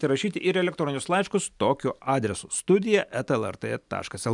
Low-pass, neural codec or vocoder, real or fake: 10.8 kHz; none; real